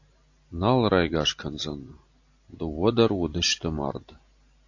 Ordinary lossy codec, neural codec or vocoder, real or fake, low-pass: AAC, 48 kbps; none; real; 7.2 kHz